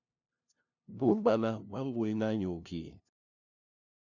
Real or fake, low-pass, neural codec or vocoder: fake; 7.2 kHz; codec, 16 kHz, 0.5 kbps, FunCodec, trained on LibriTTS, 25 frames a second